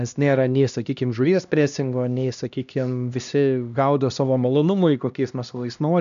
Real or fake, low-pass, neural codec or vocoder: fake; 7.2 kHz; codec, 16 kHz, 1 kbps, X-Codec, HuBERT features, trained on LibriSpeech